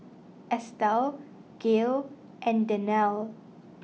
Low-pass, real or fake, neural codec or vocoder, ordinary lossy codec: none; real; none; none